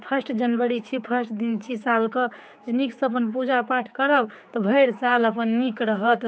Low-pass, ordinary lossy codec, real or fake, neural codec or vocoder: none; none; fake; codec, 16 kHz, 4 kbps, X-Codec, HuBERT features, trained on general audio